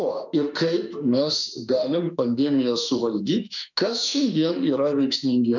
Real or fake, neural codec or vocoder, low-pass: fake; autoencoder, 48 kHz, 32 numbers a frame, DAC-VAE, trained on Japanese speech; 7.2 kHz